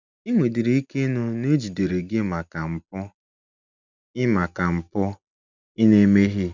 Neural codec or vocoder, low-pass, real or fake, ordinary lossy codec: none; 7.2 kHz; real; none